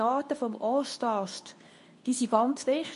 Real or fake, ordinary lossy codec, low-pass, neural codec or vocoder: fake; MP3, 48 kbps; 10.8 kHz; codec, 24 kHz, 0.9 kbps, WavTokenizer, medium speech release version 2